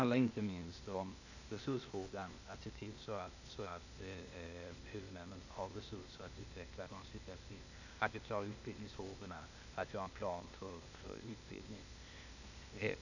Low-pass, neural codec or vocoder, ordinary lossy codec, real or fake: 7.2 kHz; codec, 16 kHz, 0.8 kbps, ZipCodec; none; fake